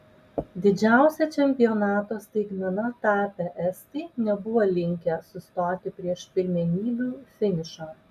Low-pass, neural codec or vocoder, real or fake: 14.4 kHz; none; real